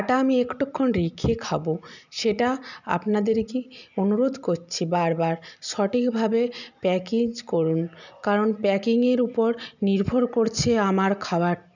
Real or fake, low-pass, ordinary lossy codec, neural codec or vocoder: real; 7.2 kHz; none; none